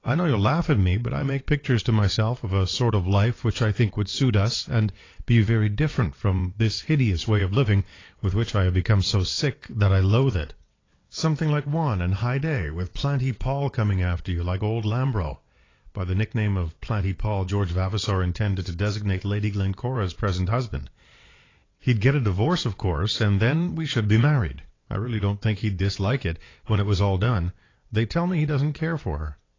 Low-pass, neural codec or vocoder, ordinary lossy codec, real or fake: 7.2 kHz; vocoder, 22.05 kHz, 80 mel bands, WaveNeXt; AAC, 32 kbps; fake